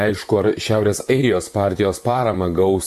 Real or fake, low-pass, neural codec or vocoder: fake; 14.4 kHz; vocoder, 44.1 kHz, 128 mel bands, Pupu-Vocoder